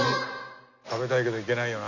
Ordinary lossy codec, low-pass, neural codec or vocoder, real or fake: none; 7.2 kHz; none; real